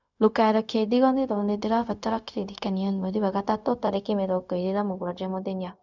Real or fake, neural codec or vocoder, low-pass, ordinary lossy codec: fake; codec, 16 kHz, 0.4 kbps, LongCat-Audio-Codec; 7.2 kHz; none